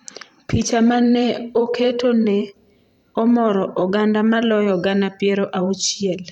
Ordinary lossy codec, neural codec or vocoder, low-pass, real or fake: none; vocoder, 44.1 kHz, 128 mel bands every 512 samples, BigVGAN v2; 19.8 kHz; fake